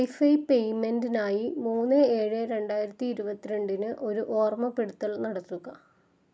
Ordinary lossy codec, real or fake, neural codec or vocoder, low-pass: none; real; none; none